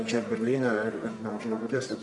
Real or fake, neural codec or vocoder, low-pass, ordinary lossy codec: fake; codec, 44.1 kHz, 1.7 kbps, Pupu-Codec; 10.8 kHz; MP3, 96 kbps